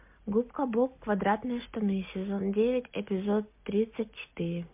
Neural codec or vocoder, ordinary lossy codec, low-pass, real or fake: none; MP3, 32 kbps; 3.6 kHz; real